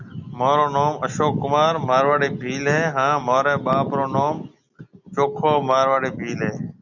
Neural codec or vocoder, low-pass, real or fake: none; 7.2 kHz; real